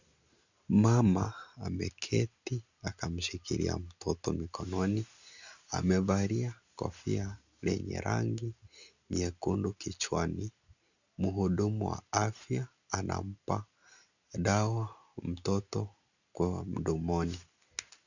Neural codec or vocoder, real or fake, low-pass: none; real; 7.2 kHz